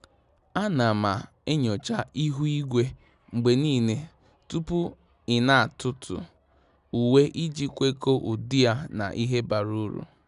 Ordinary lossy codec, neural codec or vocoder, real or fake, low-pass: none; none; real; 10.8 kHz